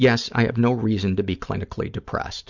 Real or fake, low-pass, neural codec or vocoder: real; 7.2 kHz; none